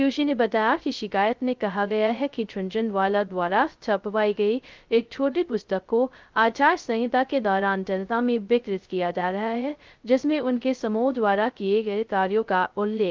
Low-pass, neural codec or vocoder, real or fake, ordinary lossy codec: 7.2 kHz; codec, 16 kHz, 0.2 kbps, FocalCodec; fake; Opus, 32 kbps